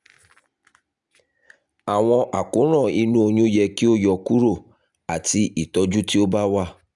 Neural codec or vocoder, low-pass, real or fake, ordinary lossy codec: none; 10.8 kHz; real; none